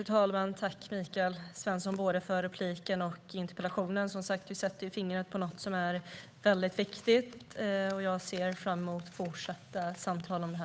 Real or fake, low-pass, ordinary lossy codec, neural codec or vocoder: fake; none; none; codec, 16 kHz, 8 kbps, FunCodec, trained on Chinese and English, 25 frames a second